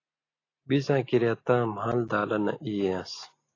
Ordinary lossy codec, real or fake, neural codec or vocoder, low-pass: AAC, 32 kbps; real; none; 7.2 kHz